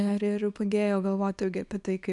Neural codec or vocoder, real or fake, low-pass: codec, 24 kHz, 0.9 kbps, WavTokenizer, small release; fake; 10.8 kHz